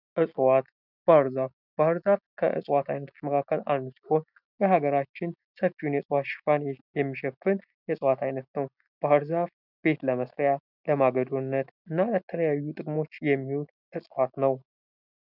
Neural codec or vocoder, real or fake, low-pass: none; real; 5.4 kHz